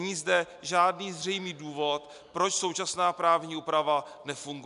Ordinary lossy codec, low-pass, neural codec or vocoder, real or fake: MP3, 96 kbps; 10.8 kHz; none; real